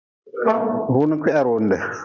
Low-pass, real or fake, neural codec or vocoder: 7.2 kHz; real; none